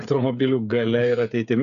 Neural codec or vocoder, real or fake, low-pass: codec, 16 kHz, 8 kbps, FreqCodec, smaller model; fake; 7.2 kHz